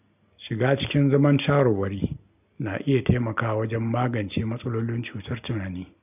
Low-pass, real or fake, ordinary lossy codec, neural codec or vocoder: 3.6 kHz; real; none; none